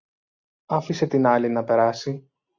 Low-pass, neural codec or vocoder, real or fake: 7.2 kHz; none; real